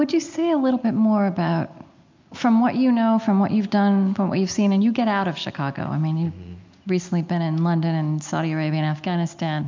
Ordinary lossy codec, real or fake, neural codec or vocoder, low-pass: MP3, 64 kbps; real; none; 7.2 kHz